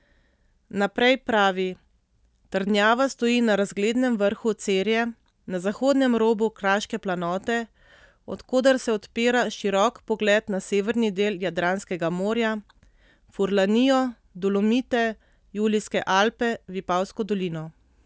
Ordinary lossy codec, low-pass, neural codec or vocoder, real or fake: none; none; none; real